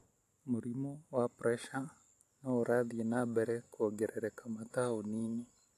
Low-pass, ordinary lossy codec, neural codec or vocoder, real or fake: 14.4 kHz; AAC, 64 kbps; none; real